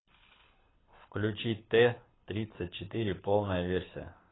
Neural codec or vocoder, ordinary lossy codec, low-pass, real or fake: codec, 24 kHz, 6 kbps, HILCodec; AAC, 16 kbps; 7.2 kHz; fake